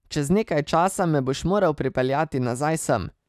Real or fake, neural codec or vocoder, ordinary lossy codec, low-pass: real; none; none; 14.4 kHz